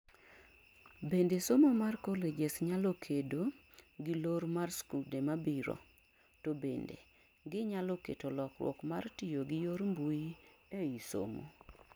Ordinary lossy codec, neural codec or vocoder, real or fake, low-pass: none; none; real; none